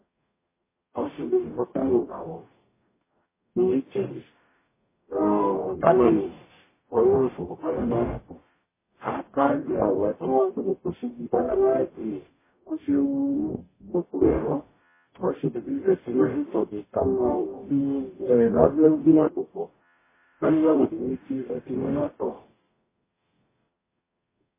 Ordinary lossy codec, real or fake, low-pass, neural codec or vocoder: MP3, 16 kbps; fake; 3.6 kHz; codec, 44.1 kHz, 0.9 kbps, DAC